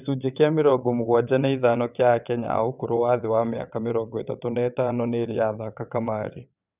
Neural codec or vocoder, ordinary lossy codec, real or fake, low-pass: vocoder, 22.05 kHz, 80 mel bands, WaveNeXt; none; fake; 3.6 kHz